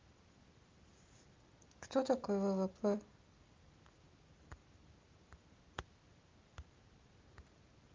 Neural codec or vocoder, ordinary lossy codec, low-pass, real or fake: none; Opus, 32 kbps; 7.2 kHz; real